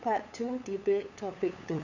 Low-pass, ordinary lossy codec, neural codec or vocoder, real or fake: 7.2 kHz; none; codec, 16 kHz, 8 kbps, FunCodec, trained on LibriTTS, 25 frames a second; fake